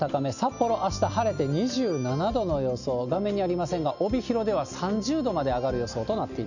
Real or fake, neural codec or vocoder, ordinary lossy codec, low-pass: real; none; none; 7.2 kHz